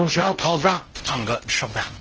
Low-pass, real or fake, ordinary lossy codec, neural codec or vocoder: 7.2 kHz; fake; Opus, 16 kbps; codec, 16 kHz in and 24 kHz out, 0.6 kbps, FocalCodec, streaming, 4096 codes